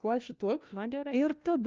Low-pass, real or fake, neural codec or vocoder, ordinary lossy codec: 7.2 kHz; fake; codec, 16 kHz, 1 kbps, FunCodec, trained on LibriTTS, 50 frames a second; Opus, 24 kbps